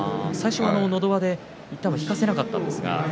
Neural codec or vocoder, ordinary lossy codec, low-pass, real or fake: none; none; none; real